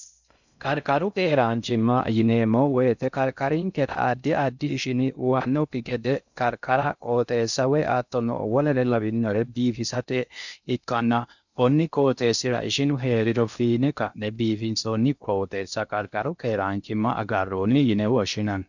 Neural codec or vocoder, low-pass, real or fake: codec, 16 kHz in and 24 kHz out, 0.6 kbps, FocalCodec, streaming, 4096 codes; 7.2 kHz; fake